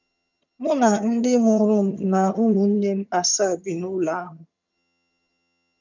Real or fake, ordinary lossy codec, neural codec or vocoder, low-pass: fake; none; vocoder, 22.05 kHz, 80 mel bands, HiFi-GAN; 7.2 kHz